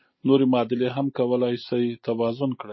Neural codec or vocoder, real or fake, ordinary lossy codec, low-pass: none; real; MP3, 24 kbps; 7.2 kHz